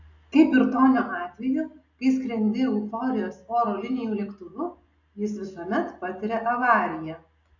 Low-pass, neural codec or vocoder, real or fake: 7.2 kHz; none; real